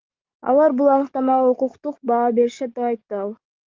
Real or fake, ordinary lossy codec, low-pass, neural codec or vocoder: fake; Opus, 24 kbps; 7.2 kHz; codec, 44.1 kHz, 7.8 kbps, DAC